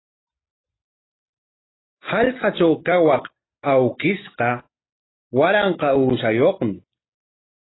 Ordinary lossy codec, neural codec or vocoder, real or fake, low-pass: AAC, 16 kbps; none; real; 7.2 kHz